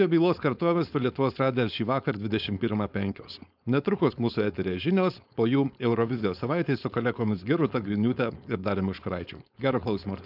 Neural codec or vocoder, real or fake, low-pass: codec, 16 kHz, 4.8 kbps, FACodec; fake; 5.4 kHz